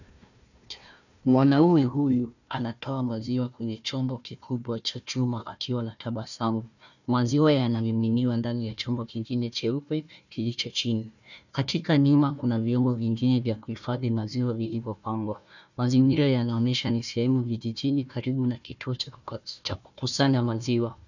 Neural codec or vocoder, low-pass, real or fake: codec, 16 kHz, 1 kbps, FunCodec, trained on Chinese and English, 50 frames a second; 7.2 kHz; fake